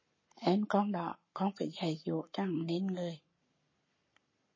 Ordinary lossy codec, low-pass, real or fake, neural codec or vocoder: MP3, 32 kbps; 7.2 kHz; fake; codec, 44.1 kHz, 7.8 kbps, Pupu-Codec